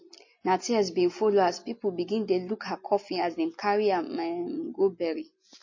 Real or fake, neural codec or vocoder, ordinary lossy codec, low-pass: real; none; MP3, 32 kbps; 7.2 kHz